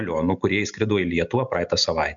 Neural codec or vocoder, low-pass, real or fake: none; 7.2 kHz; real